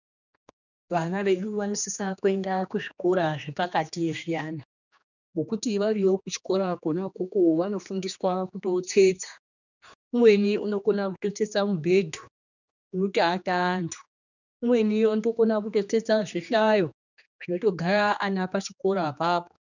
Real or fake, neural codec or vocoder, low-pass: fake; codec, 16 kHz, 2 kbps, X-Codec, HuBERT features, trained on general audio; 7.2 kHz